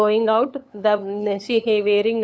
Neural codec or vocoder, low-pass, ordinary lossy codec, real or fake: codec, 16 kHz, 8 kbps, FunCodec, trained on LibriTTS, 25 frames a second; none; none; fake